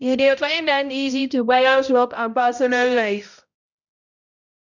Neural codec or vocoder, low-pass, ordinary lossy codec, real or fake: codec, 16 kHz, 0.5 kbps, X-Codec, HuBERT features, trained on balanced general audio; 7.2 kHz; none; fake